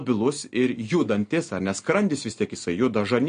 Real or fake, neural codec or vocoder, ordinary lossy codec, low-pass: real; none; MP3, 48 kbps; 9.9 kHz